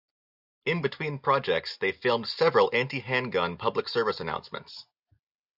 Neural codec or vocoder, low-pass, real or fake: none; 5.4 kHz; real